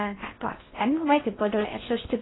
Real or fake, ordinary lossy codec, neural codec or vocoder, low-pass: fake; AAC, 16 kbps; codec, 16 kHz in and 24 kHz out, 0.8 kbps, FocalCodec, streaming, 65536 codes; 7.2 kHz